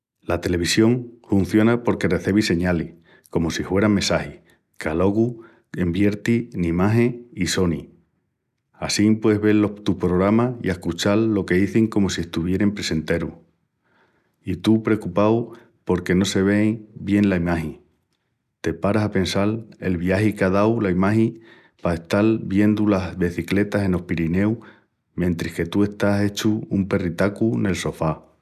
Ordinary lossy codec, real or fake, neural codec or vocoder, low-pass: none; real; none; 14.4 kHz